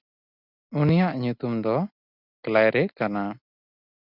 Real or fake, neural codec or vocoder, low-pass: real; none; 5.4 kHz